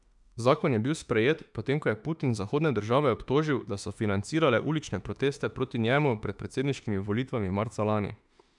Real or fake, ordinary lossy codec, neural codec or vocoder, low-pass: fake; none; autoencoder, 48 kHz, 32 numbers a frame, DAC-VAE, trained on Japanese speech; 10.8 kHz